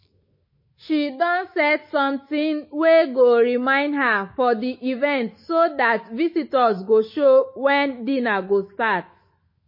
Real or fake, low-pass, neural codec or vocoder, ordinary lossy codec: fake; 5.4 kHz; autoencoder, 48 kHz, 128 numbers a frame, DAC-VAE, trained on Japanese speech; MP3, 24 kbps